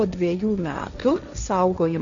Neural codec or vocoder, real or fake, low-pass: codec, 16 kHz, 1.1 kbps, Voila-Tokenizer; fake; 7.2 kHz